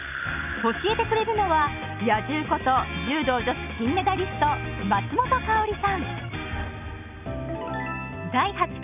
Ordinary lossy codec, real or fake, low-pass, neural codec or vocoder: none; real; 3.6 kHz; none